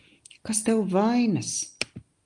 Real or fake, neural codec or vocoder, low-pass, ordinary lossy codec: real; none; 10.8 kHz; Opus, 24 kbps